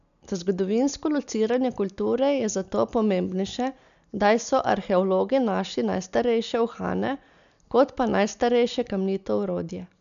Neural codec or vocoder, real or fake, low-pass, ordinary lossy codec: none; real; 7.2 kHz; none